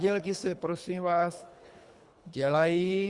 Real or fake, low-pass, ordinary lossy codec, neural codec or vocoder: fake; 10.8 kHz; Opus, 64 kbps; codec, 24 kHz, 3 kbps, HILCodec